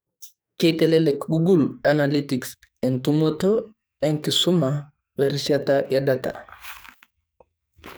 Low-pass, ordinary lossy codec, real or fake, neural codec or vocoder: none; none; fake; codec, 44.1 kHz, 2.6 kbps, SNAC